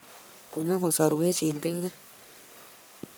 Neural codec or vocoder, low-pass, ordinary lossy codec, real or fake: codec, 44.1 kHz, 1.7 kbps, Pupu-Codec; none; none; fake